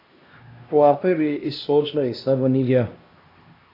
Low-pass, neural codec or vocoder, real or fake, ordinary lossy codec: 5.4 kHz; codec, 16 kHz, 1 kbps, X-Codec, HuBERT features, trained on LibriSpeech; fake; AAC, 32 kbps